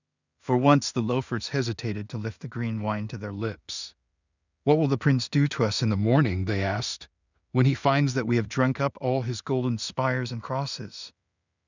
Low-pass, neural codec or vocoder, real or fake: 7.2 kHz; codec, 16 kHz in and 24 kHz out, 0.4 kbps, LongCat-Audio-Codec, two codebook decoder; fake